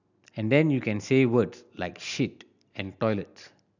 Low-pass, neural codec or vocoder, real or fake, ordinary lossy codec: 7.2 kHz; none; real; none